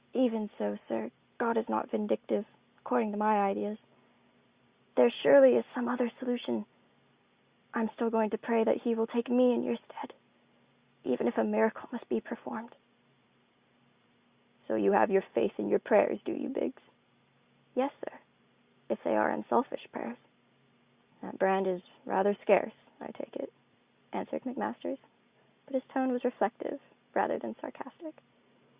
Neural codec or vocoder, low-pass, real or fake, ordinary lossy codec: none; 3.6 kHz; real; Opus, 64 kbps